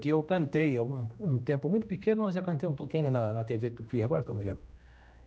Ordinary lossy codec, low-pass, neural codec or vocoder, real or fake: none; none; codec, 16 kHz, 1 kbps, X-Codec, HuBERT features, trained on general audio; fake